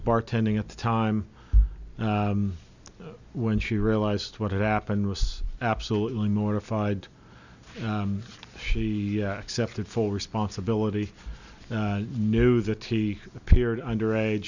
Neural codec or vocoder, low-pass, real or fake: none; 7.2 kHz; real